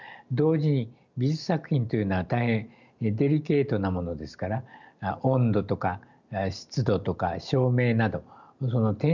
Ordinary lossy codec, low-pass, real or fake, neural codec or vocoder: none; 7.2 kHz; real; none